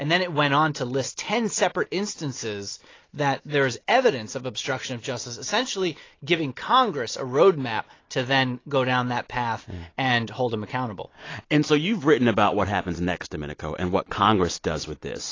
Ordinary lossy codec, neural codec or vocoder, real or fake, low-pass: AAC, 32 kbps; none; real; 7.2 kHz